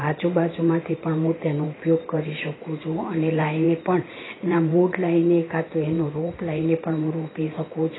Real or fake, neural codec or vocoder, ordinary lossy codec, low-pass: fake; vocoder, 44.1 kHz, 128 mel bands every 512 samples, BigVGAN v2; AAC, 16 kbps; 7.2 kHz